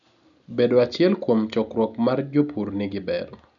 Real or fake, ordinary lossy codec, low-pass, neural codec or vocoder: real; none; 7.2 kHz; none